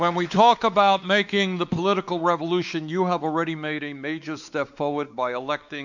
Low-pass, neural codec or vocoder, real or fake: 7.2 kHz; codec, 16 kHz, 4 kbps, X-Codec, WavLM features, trained on Multilingual LibriSpeech; fake